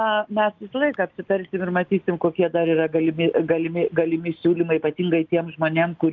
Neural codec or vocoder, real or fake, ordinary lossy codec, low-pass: none; real; Opus, 24 kbps; 7.2 kHz